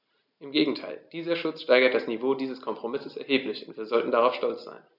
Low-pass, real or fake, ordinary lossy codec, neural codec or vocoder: 5.4 kHz; real; none; none